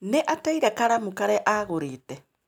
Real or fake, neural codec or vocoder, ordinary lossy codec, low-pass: real; none; none; none